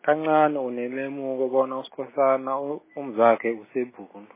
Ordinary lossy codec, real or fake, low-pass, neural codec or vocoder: MP3, 16 kbps; real; 3.6 kHz; none